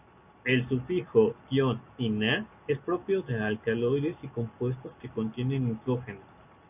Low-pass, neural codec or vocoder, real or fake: 3.6 kHz; none; real